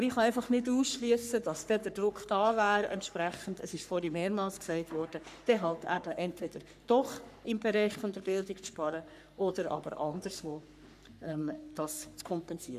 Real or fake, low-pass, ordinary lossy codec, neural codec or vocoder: fake; 14.4 kHz; none; codec, 44.1 kHz, 3.4 kbps, Pupu-Codec